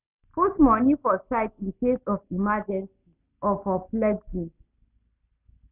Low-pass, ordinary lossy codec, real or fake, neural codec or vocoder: 3.6 kHz; none; real; none